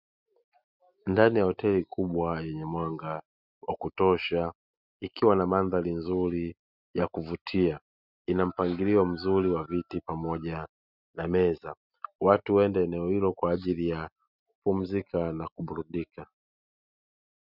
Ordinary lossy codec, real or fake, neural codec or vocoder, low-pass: Opus, 64 kbps; real; none; 5.4 kHz